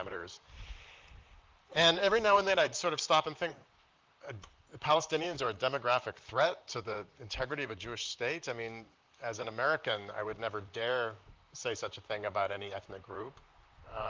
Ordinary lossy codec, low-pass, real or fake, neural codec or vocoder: Opus, 24 kbps; 7.2 kHz; fake; vocoder, 44.1 kHz, 128 mel bands, Pupu-Vocoder